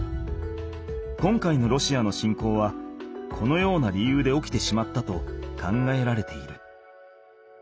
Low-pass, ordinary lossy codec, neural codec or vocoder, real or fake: none; none; none; real